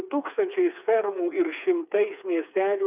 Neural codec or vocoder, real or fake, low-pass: codec, 16 kHz, 4 kbps, FreqCodec, smaller model; fake; 3.6 kHz